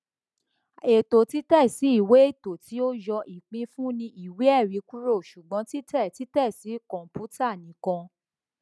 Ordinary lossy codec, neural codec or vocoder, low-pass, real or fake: none; none; none; real